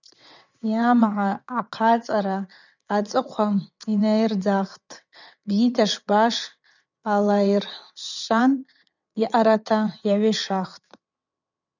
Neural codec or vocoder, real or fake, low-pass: vocoder, 22.05 kHz, 80 mel bands, WaveNeXt; fake; 7.2 kHz